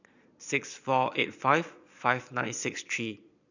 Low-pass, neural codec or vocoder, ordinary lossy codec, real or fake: 7.2 kHz; vocoder, 22.05 kHz, 80 mel bands, Vocos; none; fake